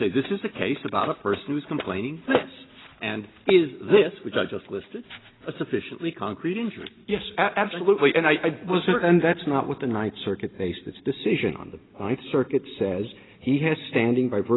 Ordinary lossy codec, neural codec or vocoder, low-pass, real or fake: AAC, 16 kbps; none; 7.2 kHz; real